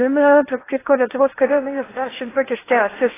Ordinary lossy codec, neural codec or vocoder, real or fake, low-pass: AAC, 16 kbps; codec, 16 kHz in and 24 kHz out, 0.8 kbps, FocalCodec, streaming, 65536 codes; fake; 3.6 kHz